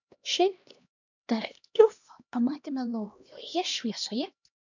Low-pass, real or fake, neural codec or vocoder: 7.2 kHz; fake; codec, 16 kHz, 1 kbps, X-Codec, HuBERT features, trained on LibriSpeech